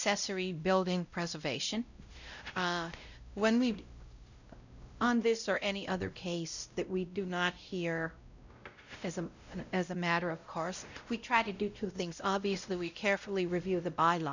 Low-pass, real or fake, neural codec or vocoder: 7.2 kHz; fake; codec, 16 kHz, 0.5 kbps, X-Codec, WavLM features, trained on Multilingual LibriSpeech